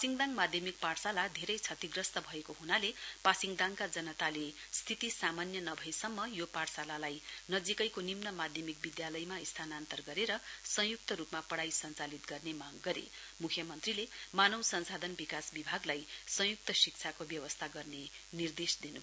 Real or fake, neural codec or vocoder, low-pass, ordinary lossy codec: real; none; none; none